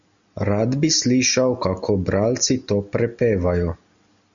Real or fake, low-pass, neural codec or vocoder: real; 7.2 kHz; none